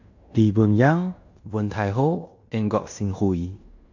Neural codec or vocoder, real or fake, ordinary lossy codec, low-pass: codec, 16 kHz in and 24 kHz out, 0.9 kbps, LongCat-Audio-Codec, fine tuned four codebook decoder; fake; none; 7.2 kHz